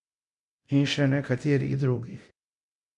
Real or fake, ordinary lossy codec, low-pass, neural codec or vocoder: fake; none; 10.8 kHz; codec, 24 kHz, 0.9 kbps, DualCodec